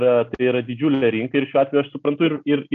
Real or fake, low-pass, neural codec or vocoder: real; 7.2 kHz; none